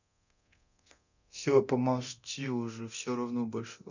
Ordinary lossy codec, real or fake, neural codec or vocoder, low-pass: none; fake; codec, 24 kHz, 0.9 kbps, DualCodec; 7.2 kHz